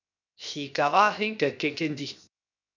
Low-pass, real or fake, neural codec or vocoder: 7.2 kHz; fake; codec, 16 kHz, 0.7 kbps, FocalCodec